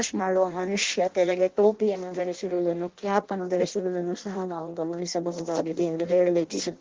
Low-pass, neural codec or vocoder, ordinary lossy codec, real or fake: 7.2 kHz; codec, 16 kHz in and 24 kHz out, 0.6 kbps, FireRedTTS-2 codec; Opus, 16 kbps; fake